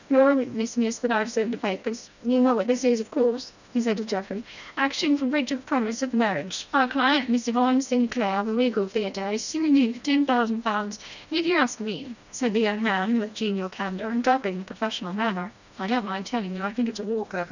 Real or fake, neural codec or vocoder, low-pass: fake; codec, 16 kHz, 1 kbps, FreqCodec, smaller model; 7.2 kHz